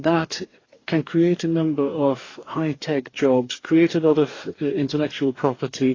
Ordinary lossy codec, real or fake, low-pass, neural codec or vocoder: AAC, 32 kbps; fake; 7.2 kHz; codec, 44.1 kHz, 2.6 kbps, DAC